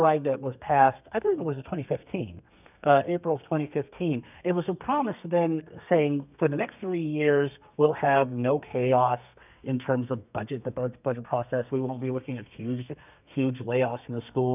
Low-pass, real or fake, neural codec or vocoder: 3.6 kHz; fake; codec, 32 kHz, 1.9 kbps, SNAC